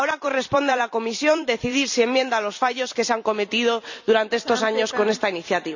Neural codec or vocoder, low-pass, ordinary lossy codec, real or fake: vocoder, 44.1 kHz, 128 mel bands every 256 samples, BigVGAN v2; 7.2 kHz; none; fake